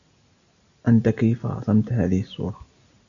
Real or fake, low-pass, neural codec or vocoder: real; 7.2 kHz; none